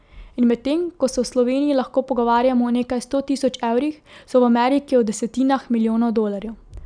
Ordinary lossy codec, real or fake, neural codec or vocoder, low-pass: none; real; none; 9.9 kHz